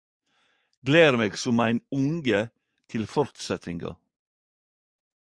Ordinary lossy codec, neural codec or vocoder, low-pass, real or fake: Opus, 64 kbps; codec, 44.1 kHz, 7.8 kbps, DAC; 9.9 kHz; fake